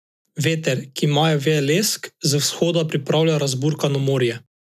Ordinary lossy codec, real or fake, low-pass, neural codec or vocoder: none; real; 14.4 kHz; none